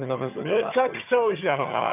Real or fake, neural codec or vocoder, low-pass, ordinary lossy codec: fake; vocoder, 22.05 kHz, 80 mel bands, HiFi-GAN; 3.6 kHz; none